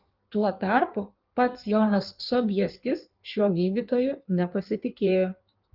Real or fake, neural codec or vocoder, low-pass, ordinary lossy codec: fake; codec, 16 kHz in and 24 kHz out, 1.1 kbps, FireRedTTS-2 codec; 5.4 kHz; Opus, 32 kbps